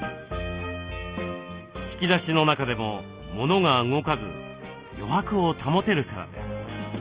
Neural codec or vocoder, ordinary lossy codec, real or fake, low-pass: none; Opus, 32 kbps; real; 3.6 kHz